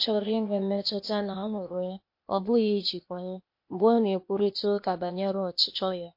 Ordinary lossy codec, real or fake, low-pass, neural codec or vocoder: MP3, 32 kbps; fake; 5.4 kHz; codec, 16 kHz, 0.8 kbps, ZipCodec